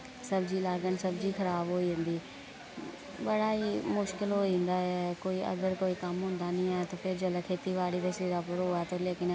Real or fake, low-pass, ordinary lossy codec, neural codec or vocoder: real; none; none; none